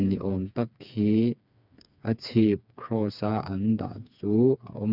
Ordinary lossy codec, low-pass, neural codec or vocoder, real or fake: none; 5.4 kHz; codec, 16 kHz, 4 kbps, FreqCodec, smaller model; fake